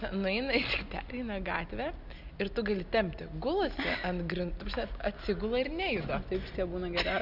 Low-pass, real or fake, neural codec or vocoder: 5.4 kHz; real; none